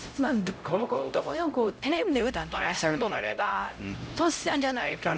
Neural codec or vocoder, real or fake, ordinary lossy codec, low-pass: codec, 16 kHz, 0.5 kbps, X-Codec, HuBERT features, trained on LibriSpeech; fake; none; none